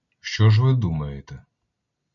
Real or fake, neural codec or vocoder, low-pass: real; none; 7.2 kHz